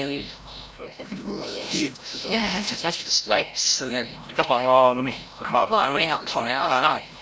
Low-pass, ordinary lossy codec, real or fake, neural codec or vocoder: none; none; fake; codec, 16 kHz, 0.5 kbps, FreqCodec, larger model